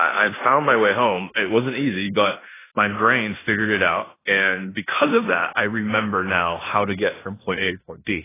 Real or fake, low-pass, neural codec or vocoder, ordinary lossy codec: fake; 3.6 kHz; codec, 16 kHz in and 24 kHz out, 0.9 kbps, LongCat-Audio-Codec, four codebook decoder; AAC, 16 kbps